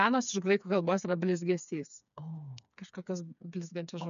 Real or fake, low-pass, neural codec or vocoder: fake; 7.2 kHz; codec, 16 kHz, 4 kbps, FreqCodec, smaller model